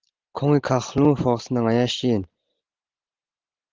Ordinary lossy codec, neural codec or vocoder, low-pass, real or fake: Opus, 16 kbps; none; 7.2 kHz; real